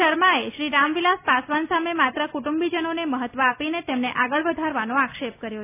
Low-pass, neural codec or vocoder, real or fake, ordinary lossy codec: 3.6 kHz; none; real; none